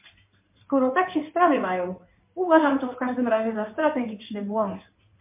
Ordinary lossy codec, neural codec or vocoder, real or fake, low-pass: MP3, 32 kbps; codec, 16 kHz in and 24 kHz out, 2.2 kbps, FireRedTTS-2 codec; fake; 3.6 kHz